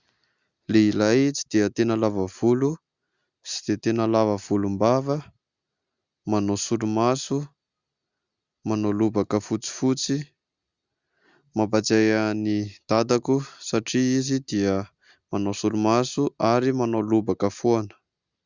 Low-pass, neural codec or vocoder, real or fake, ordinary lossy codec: 7.2 kHz; none; real; Opus, 64 kbps